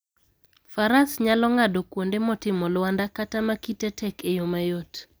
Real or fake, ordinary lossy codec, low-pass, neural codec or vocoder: real; none; none; none